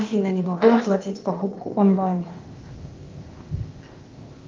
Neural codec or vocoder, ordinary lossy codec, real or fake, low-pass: codec, 16 kHz, 0.8 kbps, ZipCodec; Opus, 32 kbps; fake; 7.2 kHz